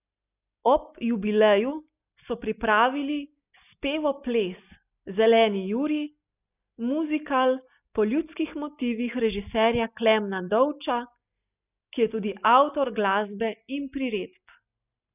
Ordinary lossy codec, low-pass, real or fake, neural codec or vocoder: none; 3.6 kHz; real; none